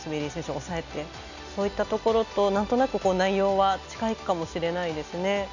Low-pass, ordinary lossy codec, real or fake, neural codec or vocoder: 7.2 kHz; none; real; none